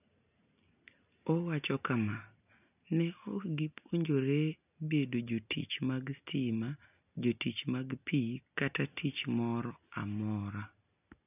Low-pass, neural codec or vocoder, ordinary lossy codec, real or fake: 3.6 kHz; none; none; real